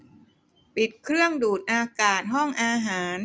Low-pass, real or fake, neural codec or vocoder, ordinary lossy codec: none; real; none; none